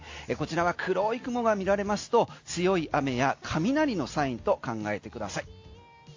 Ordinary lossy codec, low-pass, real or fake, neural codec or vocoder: AAC, 48 kbps; 7.2 kHz; real; none